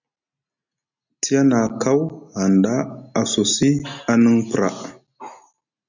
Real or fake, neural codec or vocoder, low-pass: real; none; 7.2 kHz